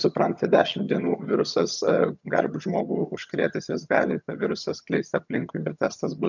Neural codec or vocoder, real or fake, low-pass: vocoder, 22.05 kHz, 80 mel bands, HiFi-GAN; fake; 7.2 kHz